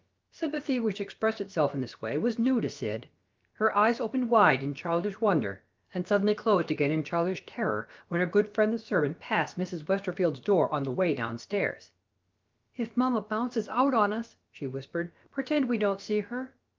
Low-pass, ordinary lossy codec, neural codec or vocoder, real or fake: 7.2 kHz; Opus, 24 kbps; codec, 16 kHz, about 1 kbps, DyCAST, with the encoder's durations; fake